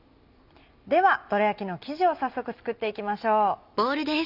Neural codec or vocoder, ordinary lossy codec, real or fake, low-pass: none; none; real; 5.4 kHz